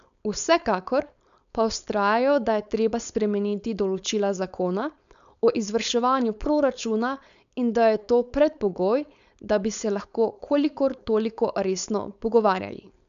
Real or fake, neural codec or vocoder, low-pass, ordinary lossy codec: fake; codec, 16 kHz, 4.8 kbps, FACodec; 7.2 kHz; none